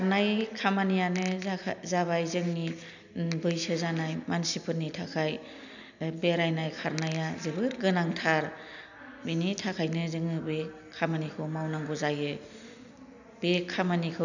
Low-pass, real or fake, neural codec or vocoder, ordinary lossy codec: 7.2 kHz; real; none; none